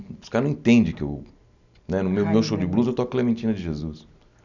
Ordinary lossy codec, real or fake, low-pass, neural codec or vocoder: none; real; 7.2 kHz; none